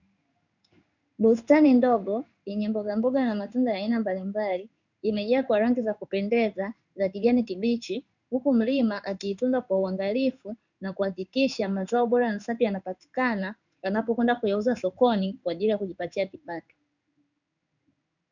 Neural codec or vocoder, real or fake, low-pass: codec, 16 kHz in and 24 kHz out, 1 kbps, XY-Tokenizer; fake; 7.2 kHz